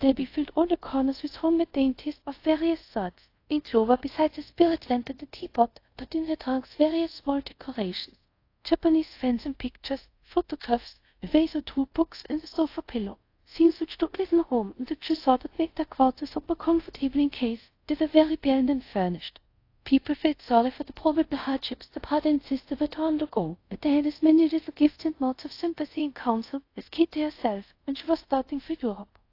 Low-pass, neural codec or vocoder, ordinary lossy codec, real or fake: 5.4 kHz; codec, 24 kHz, 0.5 kbps, DualCodec; AAC, 32 kbps; fake